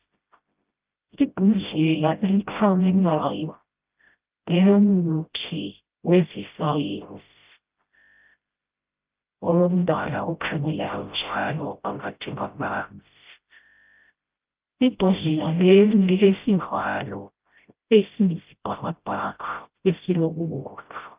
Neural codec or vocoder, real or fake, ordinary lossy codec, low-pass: codec, 16 kHz, 0.5 kbps, FreqCodec, smaller model; fake; Opus, 24 kbps; 3.6 kHz